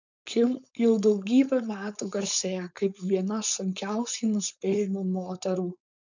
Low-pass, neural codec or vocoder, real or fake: 7.2 kHz; codec, 16 kHz, 4.8 kbps, FACodec; fake